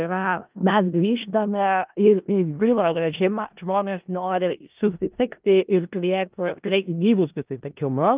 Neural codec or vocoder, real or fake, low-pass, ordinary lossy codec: codec, 16 kHz in and 24 kHz out, 0.4 kbps, LongCat-Audio-Codec, four codebook decoder; fake; 3.6 kHz; Opus, 24 kbps